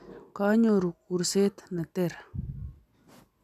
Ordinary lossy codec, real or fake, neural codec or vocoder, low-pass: none; real; none; 14.4 kHz